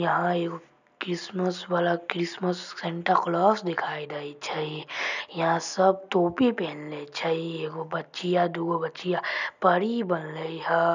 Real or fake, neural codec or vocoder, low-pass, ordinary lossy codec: real; none; 7.2 kHz; none